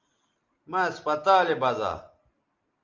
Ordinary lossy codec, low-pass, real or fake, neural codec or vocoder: Opus, 24 kbps; 7.2 kHz; real; none